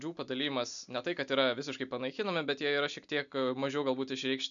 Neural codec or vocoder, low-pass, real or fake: none; 7.2 kHz; real